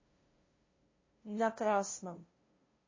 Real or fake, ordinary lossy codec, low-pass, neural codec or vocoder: fake; MP3, 32 kbps; 7.2 kHz; codec, 16 kHz, 0.5 kbps, FunCodec, trained on LibriTTS, 25 frames a second